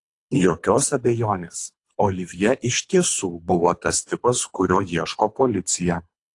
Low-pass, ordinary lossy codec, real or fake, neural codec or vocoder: 10.8 kHz; AAC, 48 kbps; fake; codec, 24 kHz, 3 kbps, HILCodec